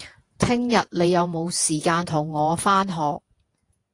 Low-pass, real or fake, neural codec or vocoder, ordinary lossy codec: 10.8 kHz; fake; vocoder, 48 kHz, 128 mel bands, Vocos; AAC, 48 kbps